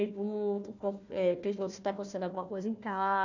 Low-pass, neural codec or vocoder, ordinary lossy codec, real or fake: 7.2 kHz; codec, 16 kHz, 1 kbps, FunCodec, trained on Chinese and English, 50 frames a second; none; fake